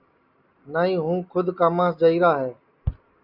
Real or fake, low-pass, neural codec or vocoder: real; 5.4 kHz; none